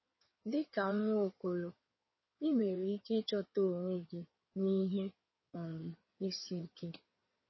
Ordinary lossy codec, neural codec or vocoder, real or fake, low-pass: MP3, 24 kbps; codec, 16 kHz in and 24 kHz out, 2.2 kbps, FireRedTTS-2 codec; fake; 7.2 kHz